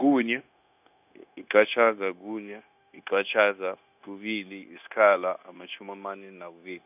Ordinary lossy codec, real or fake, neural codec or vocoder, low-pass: none; fake; codec, 16 kHz, 0.9 kbps, LongCat-Audio-Codec; 3.6 kHz